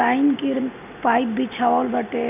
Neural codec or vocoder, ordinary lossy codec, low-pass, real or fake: none; none; 3.6 kHz; real